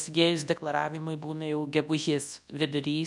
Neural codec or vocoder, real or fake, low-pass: codec, 24 kHz, 0.9 kbps, WavTokenizer, large speech release; fake; 10.8 kHz